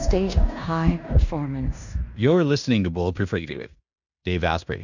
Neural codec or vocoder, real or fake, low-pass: codec, 16 kHz in and 24 kHz out, 0.9 kbps, LongCat-Audio-Codec, fine tuned four codebook decoder; fake; 7.2 kHz